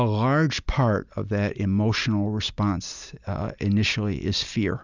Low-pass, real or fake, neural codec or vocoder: 7.2 kHz; real; none